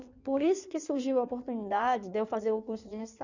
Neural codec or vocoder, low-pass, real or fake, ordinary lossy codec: codec, 16 kHz in and 24 kHz out, 1.1 kbps, FireRedTTS-2 codec; 7.2 kHz; fake; none